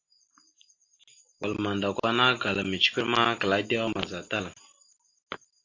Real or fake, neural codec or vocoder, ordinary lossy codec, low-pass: real; none; AAC, 48 kbps; 7.2 kHz